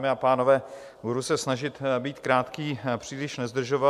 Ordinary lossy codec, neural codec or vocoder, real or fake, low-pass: MP3, 96 kbps; none; real; 14.4 kHz